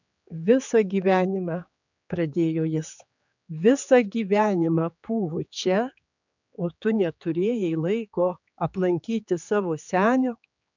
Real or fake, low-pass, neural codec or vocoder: fake; 7.2 kHz; codec, 16 kHz, 4 kbps, X-Codec, HuBERT features, trained on general audio